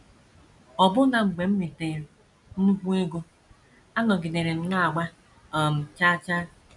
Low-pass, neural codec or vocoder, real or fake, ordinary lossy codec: 10.8 kHz; none; real; none